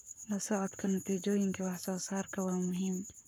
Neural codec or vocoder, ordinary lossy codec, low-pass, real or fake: codec, 44.1 kHz, 7.8 kbps, Pupu-Codec; none; none; fake